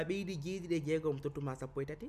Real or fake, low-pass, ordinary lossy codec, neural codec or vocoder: real; 14.4 kHz; none; none